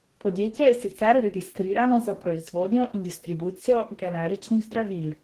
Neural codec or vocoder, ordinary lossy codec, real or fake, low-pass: codec, 44.1 kHz, 2.6 kbps, DAC; Opus, 16 kbps; fake; 14.4 kHz